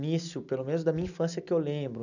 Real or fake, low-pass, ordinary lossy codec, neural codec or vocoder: real; 7.2 kHz; none; none